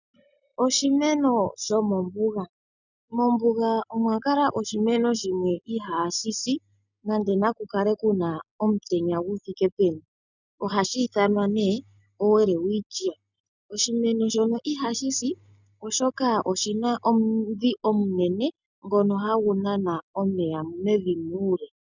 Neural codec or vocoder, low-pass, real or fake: none; 7.2 kHz; real